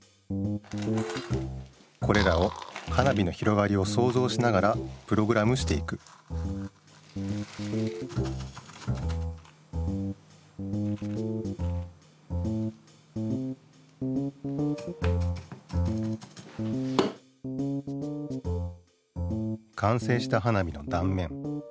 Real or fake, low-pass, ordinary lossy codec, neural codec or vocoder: real; none; none; none